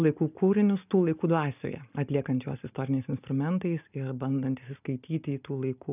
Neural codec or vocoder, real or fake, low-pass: none; real; 3.6 kHz